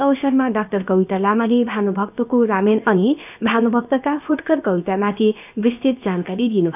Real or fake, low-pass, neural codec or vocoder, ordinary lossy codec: fake; 3.6 kHz; codec, 16 kHz, 0.7 kbps, FocalCodec; none